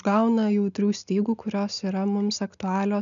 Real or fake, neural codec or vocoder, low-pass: real; none; 7.2 kHz